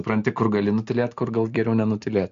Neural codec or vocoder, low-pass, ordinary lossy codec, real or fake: none; 7.2 kHz; MP3, 48 kbps; real